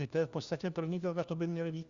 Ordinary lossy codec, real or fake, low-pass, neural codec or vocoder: Opus, 64 kbps; fake; 7.2 kHz; codec, 16 kHz, 1 kbps, FunCodec, trained on LibriTTS, 50 frames a second